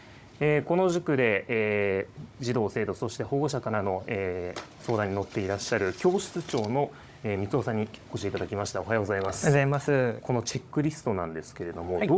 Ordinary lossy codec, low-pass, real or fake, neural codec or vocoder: none; none; fake; codec, 16 kHz, 16 kbps, FunCodec, trained on Chinese and English, 50 frames a second